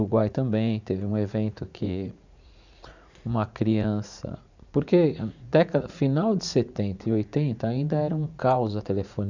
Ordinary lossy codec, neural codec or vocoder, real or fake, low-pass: none; vocoder, 44.1 kHz, 80 mel bands, Vocos; fake; 7.2 kHz